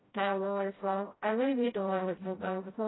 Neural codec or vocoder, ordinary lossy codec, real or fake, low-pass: codec, 16 kHz, 0.5 kbps, FreqCodec, smaller model; AAC, 16 kbps; fake; 7.2 kHz